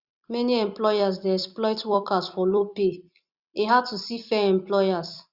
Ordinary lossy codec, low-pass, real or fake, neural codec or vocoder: Opus, 64 kbps; 5.4 kHz; fake; vocoder, 44.1 kHz, 128 mel bands every 256 samples, BigVGAN v2